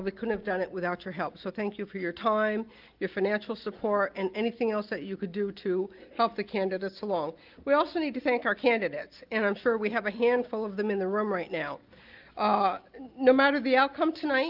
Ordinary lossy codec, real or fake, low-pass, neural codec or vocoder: Opus, 32 kbps; real; 5.4 kHz; none